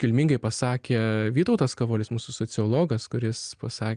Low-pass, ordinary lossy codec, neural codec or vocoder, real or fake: 9.9 kHz; Opus, 32 kbps; none; real